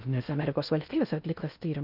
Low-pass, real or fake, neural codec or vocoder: 5.4 kHz; fake; codec, 16 kHz in and 24 kHz out, 0.6 kbps, FocalCodec, streaming, 4096 codes